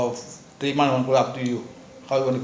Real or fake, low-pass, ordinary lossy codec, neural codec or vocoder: real; none; none; none